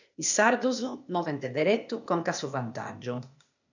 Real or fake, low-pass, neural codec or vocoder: fake; 7.2 kHz; codec, 16 kHz, 0.8 kbps, ZipCodec